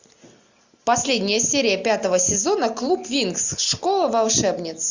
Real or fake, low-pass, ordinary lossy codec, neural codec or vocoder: real; 7.2 kHz; Opus, 64 kbps; none